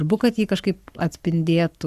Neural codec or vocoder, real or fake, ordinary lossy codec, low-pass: codec, 44.1 kHz, 7.8 kbps, Pupu-Codec; fake; Opus, 64 kbps; 14.4 kHz